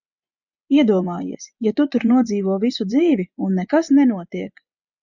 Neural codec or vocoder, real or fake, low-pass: vocoder, 24 kHz, 100 mel bands, Vocos; fake; 7.2 kHz